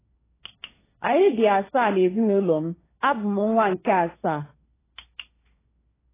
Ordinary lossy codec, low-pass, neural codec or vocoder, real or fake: AAC, 16 kbps; 3.6 kHz; codec, 16 kHz, 1.1 kbps, Voila-Tokenizer; fake